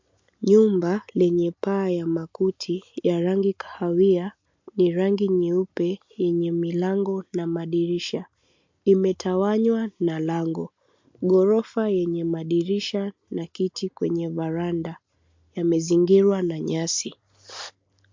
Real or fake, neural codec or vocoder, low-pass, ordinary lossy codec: real; none; 7.2 kHz; MP3, 48 kbps